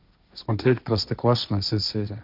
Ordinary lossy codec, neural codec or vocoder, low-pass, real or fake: none; codec, 16 kHz, 1.1 kbps, Voila-Tokenizer; 5.4 kHz; fake